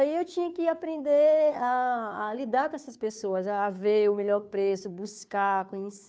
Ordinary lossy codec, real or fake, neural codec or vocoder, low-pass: none; fake; codec, 16 kHz, 2 kbps, FunCodec, trained on Chinese and English, 25 frames a second; none